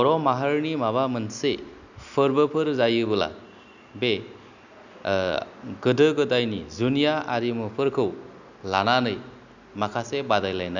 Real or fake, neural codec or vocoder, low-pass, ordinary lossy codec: real; none; 7.2 kHz; none